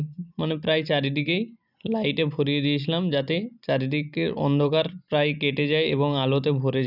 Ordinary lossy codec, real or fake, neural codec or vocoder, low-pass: none; real; none; 5.4 kHz